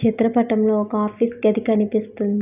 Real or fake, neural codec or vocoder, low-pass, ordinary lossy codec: real; none; 3.6 kHz; none